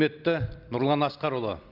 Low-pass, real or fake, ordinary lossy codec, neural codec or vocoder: 5.4 kHz; real; Opus, 24 kbps; none